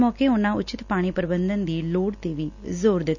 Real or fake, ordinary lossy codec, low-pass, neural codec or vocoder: real; none; 7.2 kHz; none